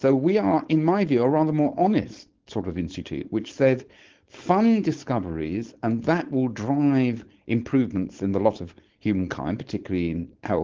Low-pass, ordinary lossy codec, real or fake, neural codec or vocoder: 7.2 kHz; Opus, 16 kbps; fake; codec, 16 kHz, 4.8 kbps, FACodec